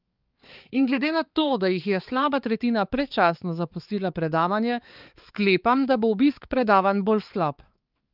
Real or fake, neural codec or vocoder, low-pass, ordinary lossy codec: fake; codec, 16 kHz, 4 kbps, X-Codec, HuBERT features, trained on balanced general audio; 5.4 kHz; Opus, 24 kbps